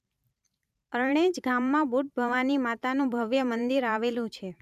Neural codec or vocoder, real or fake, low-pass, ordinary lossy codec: vocoder, 44.1 kHz, 128 mel bands every 512 samples, BigVGAN v2; fake; 14.4 kHz; none